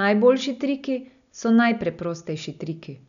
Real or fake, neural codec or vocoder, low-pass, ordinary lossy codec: real; none; 7.2 kHz; none